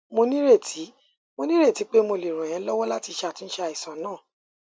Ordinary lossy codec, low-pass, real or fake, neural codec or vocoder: none; none; real; none